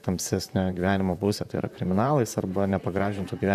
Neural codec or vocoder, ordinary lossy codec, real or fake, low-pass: vocoder, 44.1 kHz, 128 mel bands, Pupu-Vocoder; AAC, 96 kbps; fake; 14.4 kHz